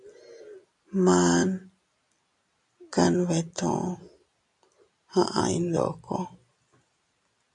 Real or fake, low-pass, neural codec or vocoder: real; 10.8 kHz; none